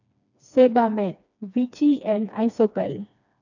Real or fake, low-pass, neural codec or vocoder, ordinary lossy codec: fake; 7.2 kHz; codec, 16 kHz, 2 kbps, FreqCodec, smaller model; none